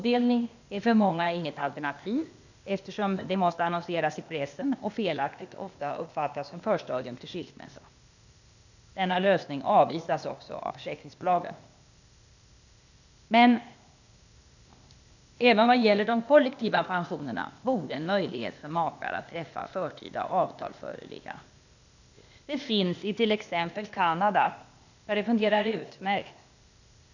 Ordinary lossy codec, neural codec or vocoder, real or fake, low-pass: none; codec, 16 kHz, 0.8 kbps, ZipCodec; fake; 7.2 kHz